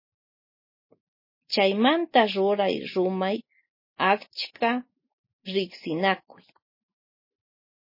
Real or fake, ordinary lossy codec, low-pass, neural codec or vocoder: real; MP3, 24 kbps; 5.4 kHz; none